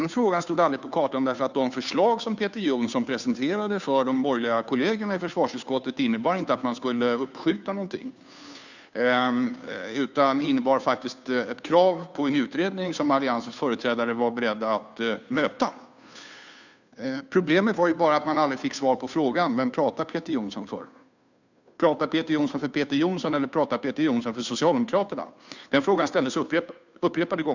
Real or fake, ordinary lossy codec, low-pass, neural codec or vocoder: fake; none; 7.2 kHz; codec, 16 kHz, 2 kbps, FunCodec, trained on Chinese and English, 25 frames a second